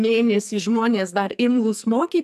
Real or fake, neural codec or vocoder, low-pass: fake; codec, 44.1 kHz, 2.6 kbps, SNAC; 14.4 kHz